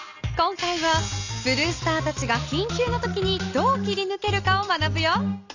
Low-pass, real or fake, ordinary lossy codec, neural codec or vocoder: 7.2 kHz; real; none; none